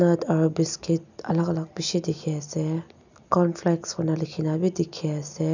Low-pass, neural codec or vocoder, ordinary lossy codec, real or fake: 7.2 kHz; none; none; real